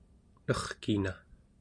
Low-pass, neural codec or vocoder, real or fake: 9.9 kHz; none; real